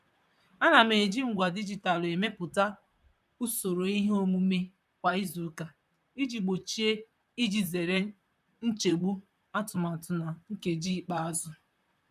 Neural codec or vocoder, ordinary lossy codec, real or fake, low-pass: vocoder, 44.1 kHz, 128 mel bands, Pupu-Vocoder; none; fake; 14.4 kHz